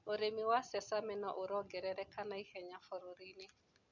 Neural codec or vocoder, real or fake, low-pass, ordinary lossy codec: none; real; 7.2 kHz; none